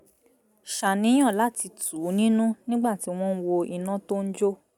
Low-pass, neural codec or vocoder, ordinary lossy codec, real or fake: 19.8 kHz; none; none; real